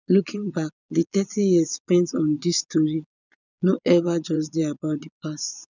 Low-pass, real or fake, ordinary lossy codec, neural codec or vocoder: 7.2 kHz; fake; none; vocoder, 22.05 kHz, 80 mel bands, Vocos